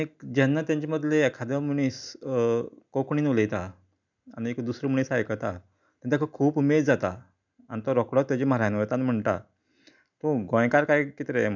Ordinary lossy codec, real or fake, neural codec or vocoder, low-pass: none; real; none; 7.2 kHz